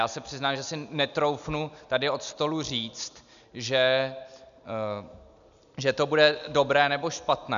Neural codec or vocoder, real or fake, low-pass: none; real; 7.2 kHz